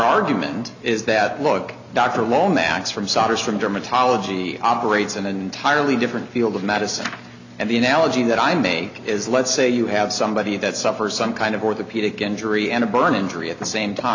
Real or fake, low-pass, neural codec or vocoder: real; 7.2 kHz; none